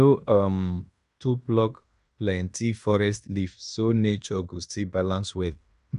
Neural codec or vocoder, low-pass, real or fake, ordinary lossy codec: codec, 16 kHz in and 24 kHz out, 0.9 kbps, LongCat-Audio-Codec, fine tuned four codebook decoder; 10.8 kHz; fake; none